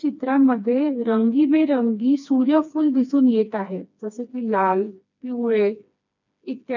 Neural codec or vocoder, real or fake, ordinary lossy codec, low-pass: codec, 16 kHz, 2 kbps, FreqCodec, smaller model; fake; none; 7.2 kHz